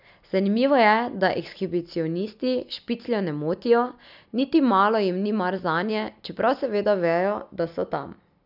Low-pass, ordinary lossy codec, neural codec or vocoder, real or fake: 5.4 kHz; none; none; real